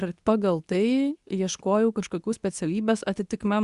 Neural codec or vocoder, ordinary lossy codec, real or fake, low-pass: codec, 24 kHz, 0.9 kbps, WavTokenizer, medium speech release version 2; Opus, 64 kbps; fake; 10.8 kHz